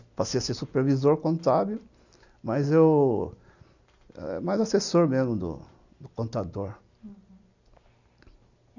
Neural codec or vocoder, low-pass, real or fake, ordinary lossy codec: none; 7.2 kHz; real; none